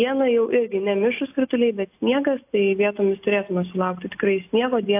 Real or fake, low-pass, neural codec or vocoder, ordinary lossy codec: real; 3.6 kHz; none; AAC, 32 kbps